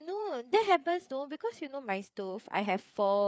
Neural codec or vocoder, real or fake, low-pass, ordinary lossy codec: codec, 16 kHz, 4 kbps, FreqCodec, larger model; fake; none; none